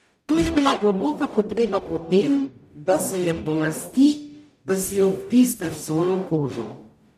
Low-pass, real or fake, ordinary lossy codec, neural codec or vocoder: 14.4 kHz; fake; MP3, 96 kbps; codec, 44.1 kHz, 0.9 kbps, DAC